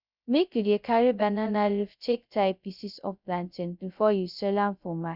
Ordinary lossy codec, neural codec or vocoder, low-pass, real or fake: none; codec, 16 kHz, 0.2 kbps, FocalCodec; 5.4 kHz; fake